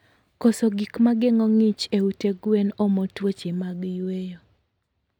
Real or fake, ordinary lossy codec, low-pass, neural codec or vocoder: real; none; 19.8 kHz; none